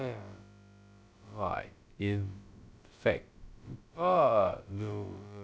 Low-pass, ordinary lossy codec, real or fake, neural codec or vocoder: none; none; fake; codec, 16 kHz, about 1 kbps, DyCAST, with the encoder's durations